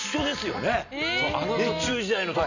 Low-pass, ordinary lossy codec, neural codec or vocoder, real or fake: 7.2 kHz; none; none; real